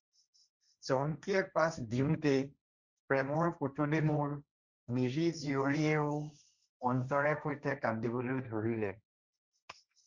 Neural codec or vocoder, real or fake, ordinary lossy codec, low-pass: codec, 16 kHz, 1.1 kbps, Voila-Tokenizer; fake; Opus, 64 kbps; 7.2 kHz